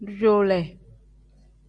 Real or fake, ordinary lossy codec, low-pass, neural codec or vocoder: real; Opus, 64 kbps; 9.9 kHz; none